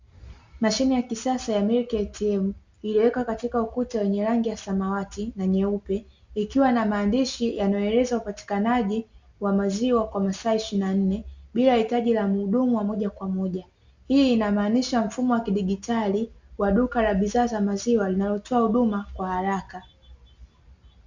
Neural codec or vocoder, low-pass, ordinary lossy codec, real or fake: none; 7.2 kHz; Opus, 64 kbps; real